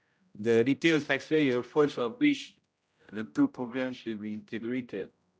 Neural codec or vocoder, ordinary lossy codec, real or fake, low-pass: codec, 16 kHz, 0.5 kbps, X-Codec, HuBERT features, trained on general audio; none; fake; none